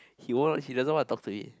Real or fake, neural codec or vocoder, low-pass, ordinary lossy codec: real; none; none; none